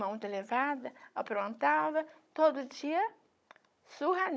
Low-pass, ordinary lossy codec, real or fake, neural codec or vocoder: none; none; fake; codec, 16 kHz, 16 kbps, FunCodec, trained on Chinese and English, 50 frames a second